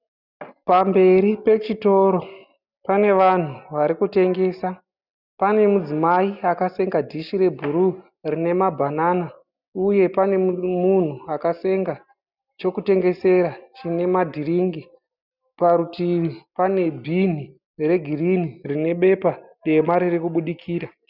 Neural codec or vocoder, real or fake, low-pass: none; real; 5.4 kHz